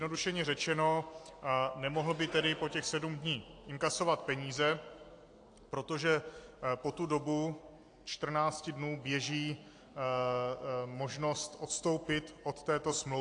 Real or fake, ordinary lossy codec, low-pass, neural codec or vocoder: real; AAC, 48 kbps; 9.9 kHz; none